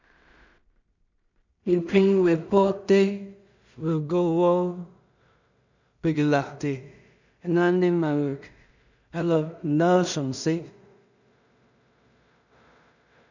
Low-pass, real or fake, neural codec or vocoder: 7.2 kHz; fake; codec, 16 kHz in and 24 kHz out, 0.4 kbps, LongCat-Audio-Codec, two codebook decoder